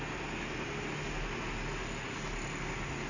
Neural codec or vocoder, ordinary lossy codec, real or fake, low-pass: none; none; real; 7.2 kHz